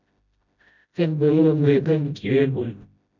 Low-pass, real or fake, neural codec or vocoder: 7.2 kHz; fake; codec, 16 kHz, 0.5 kbps, FreqCodec, smaller model